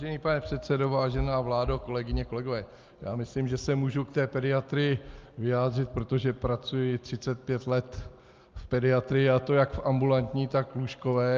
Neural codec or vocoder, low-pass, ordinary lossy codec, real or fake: none; 7.2 kHz; Opus, 24 kbps; real